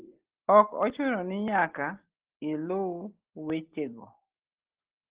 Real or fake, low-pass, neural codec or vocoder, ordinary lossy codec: real; 3.6 kHz; none; Opus, 16 kbps